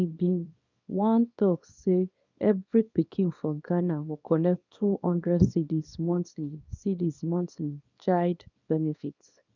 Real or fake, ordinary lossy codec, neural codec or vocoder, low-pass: fake; none; codec, 24 kHz, 0.9 kbps, WavTokenizer, small release; 7.2 kHz